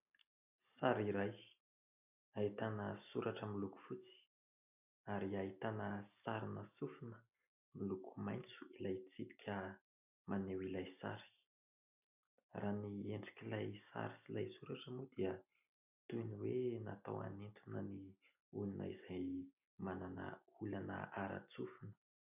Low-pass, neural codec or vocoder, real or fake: 3.6 kHz; none; real